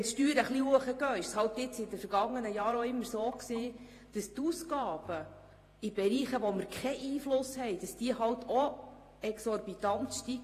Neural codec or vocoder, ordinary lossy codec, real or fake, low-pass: vocoder, 48 kHz, 128 mel bands, Vocos; AAC, 48 kbps; fake; 14.4 kHz